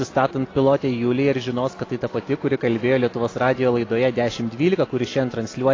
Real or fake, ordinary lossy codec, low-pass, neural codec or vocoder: real; AAC, 32 kbps; 7.2 kHz; none